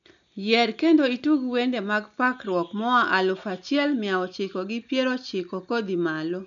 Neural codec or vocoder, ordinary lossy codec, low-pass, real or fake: none; none; 7.2 kHz; real